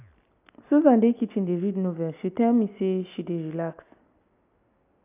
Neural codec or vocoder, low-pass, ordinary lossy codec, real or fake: none; 3.6 kHz; AAC, 32 kbps; real